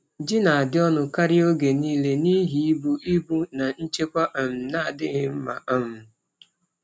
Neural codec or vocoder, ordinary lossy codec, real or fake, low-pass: none; none; real; none